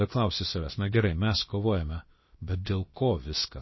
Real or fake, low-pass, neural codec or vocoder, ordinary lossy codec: fake; 7.2 kHz; codec, 16 kHz, about 1 kbps, DyCAST, with the encoder's durations; MP3, 24 kbps